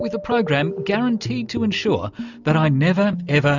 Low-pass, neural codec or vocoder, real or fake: 7.2 kHz; none; real